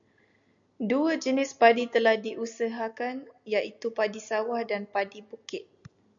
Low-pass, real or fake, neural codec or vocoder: 7.2 kHz; real; none